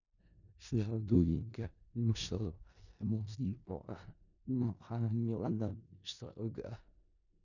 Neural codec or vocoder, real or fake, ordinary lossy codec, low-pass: codec, 16 kHz in and 24 kHz out, 0.4 kbps, LongCat-Audio-Codec, four codebook decoder; fake; none; 7.2 kHz